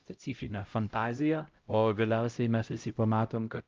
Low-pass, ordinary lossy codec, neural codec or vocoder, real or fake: 7.2 kHz; Opus, 24 kbps; codec, 16 kHz, 0.5 kbps, X-Codec, HuBERT features, trained on LibriSpeech; fake